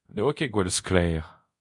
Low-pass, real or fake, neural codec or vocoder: 10.8 kHz; fake; codec, 24 kHz, 0.5 kbps, DualCodec